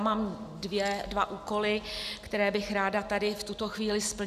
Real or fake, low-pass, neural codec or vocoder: real; 14.4 kHz; none